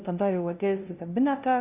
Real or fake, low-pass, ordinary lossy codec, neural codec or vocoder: fake; 3.6 kHz; Opus, 64 kbps; codec, 16 kHz, 0.5 kbps, FunCodec, trained on LibriTTS, 25 frames a second